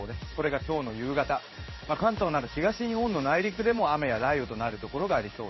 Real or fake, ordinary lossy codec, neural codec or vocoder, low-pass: fake; MP3, 24 kbps; codec, 16 kHz in and 24 kHz out, 1 kbps, XY-Tokenizer; 7.2 kHz